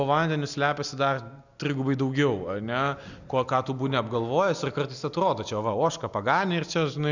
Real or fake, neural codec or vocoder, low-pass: real; none; 7.2 kHz